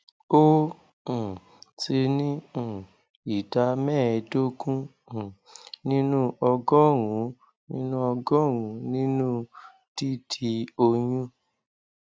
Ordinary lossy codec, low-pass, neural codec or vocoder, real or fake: none; none; none; real